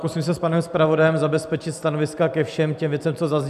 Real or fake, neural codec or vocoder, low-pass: real; none; 14.4 kHz